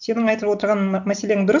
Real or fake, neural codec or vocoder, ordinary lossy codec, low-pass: real; none; none; 7.2 kHz